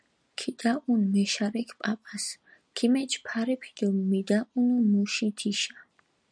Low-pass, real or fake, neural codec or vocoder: 9.9 kHz; real; none